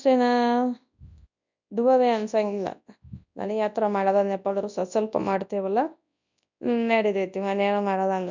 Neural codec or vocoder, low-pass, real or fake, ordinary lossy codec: codec, 24 kHz, 0.9 kbps, WavTokenizer, large speech release; 7.2 kHz; fake; none